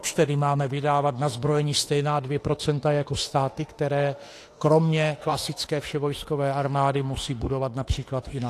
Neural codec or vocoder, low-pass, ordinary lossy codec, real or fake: autoencoder, 48 kHz, 32 numbers a frame, DAC-VAE, trained on Japanese speech; 14.4 kHz; AAC, 48 kbps; fake